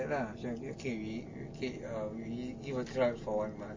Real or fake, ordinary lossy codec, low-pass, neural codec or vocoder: real; MP3, 32 kbps; 7.2 kHz; none